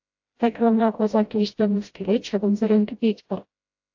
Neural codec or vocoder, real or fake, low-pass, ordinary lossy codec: codec, 16 kHz, 0.5 kbps, FreqCodec, smaller model; fake; 7.2 kHz; AAC, 48 kbps